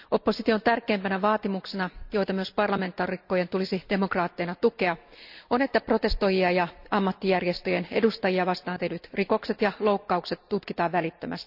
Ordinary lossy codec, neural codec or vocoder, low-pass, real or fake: none; none; 5.4 kHz; real